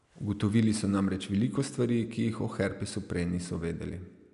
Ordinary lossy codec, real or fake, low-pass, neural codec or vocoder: none; real; 10.8 kHz; none